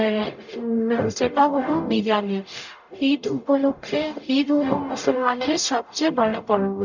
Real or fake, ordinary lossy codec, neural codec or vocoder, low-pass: fake; none; codec, 44.1 kHz, 0.9 kbps, DAC; 7.2 kHz